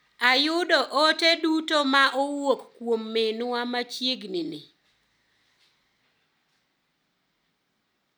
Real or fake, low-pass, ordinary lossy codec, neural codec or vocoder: real; none; none; none